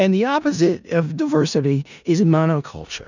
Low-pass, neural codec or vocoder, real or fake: 7.2 kHz; codec, 16 kHz in and 24 kHz out, 0.4 kbps, LongCat-Audio-Codec, four codebook decoder; fake